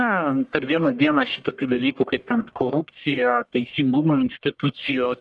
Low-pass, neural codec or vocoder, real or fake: 10.8 kHz; codec, 44.1 kHz, 1.7 kbps, Pupu-Codec; fake